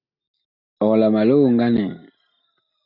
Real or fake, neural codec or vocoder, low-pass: real; none; 5.4 kHz